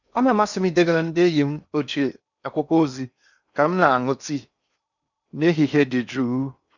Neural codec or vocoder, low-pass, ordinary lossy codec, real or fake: codec, 16 kHz in and 24 kHz out, 0.8 kbps, FocalCodec, streaming, 65536 codes; 7.2 kHz; none; fake